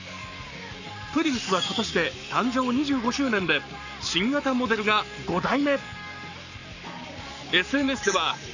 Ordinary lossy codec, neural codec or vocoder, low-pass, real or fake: none; codec, 44.1 kHz, 7.8 kbps, Pupu-Codec; 7.2 kHz; fake